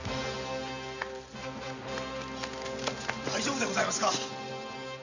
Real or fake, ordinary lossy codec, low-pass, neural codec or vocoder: real; none; 7.2 kHz; none